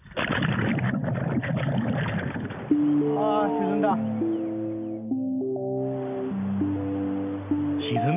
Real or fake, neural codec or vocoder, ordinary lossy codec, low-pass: real; none; none; 3.6 kHz